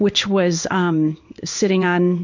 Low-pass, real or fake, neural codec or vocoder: 7.2 kHz; fake; codec, 16 kHz in and 24 kHz out, 1 kbps, XY-Tokenizer